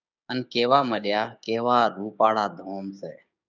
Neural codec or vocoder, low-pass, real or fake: codec, 16 kHz, 6 kbps, DAC; 7.2 kHz; fake